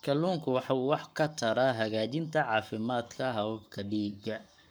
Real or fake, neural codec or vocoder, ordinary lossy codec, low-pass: fake; codec, 44.1 kHz, 7.8 kbps, Pupu-Codec; none; none